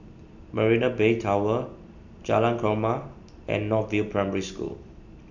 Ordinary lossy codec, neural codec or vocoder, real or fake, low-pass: none; none; real; 7.2 kHz